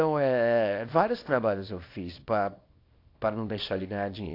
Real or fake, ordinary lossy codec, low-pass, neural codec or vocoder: fake; AAC, 32 kbps; 5.4 kHz; codec, 24 kHz, 0.9 kbps, WavTokenizer, small release